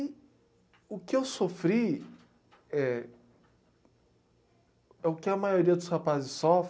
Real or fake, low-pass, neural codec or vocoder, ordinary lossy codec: real; none; none; none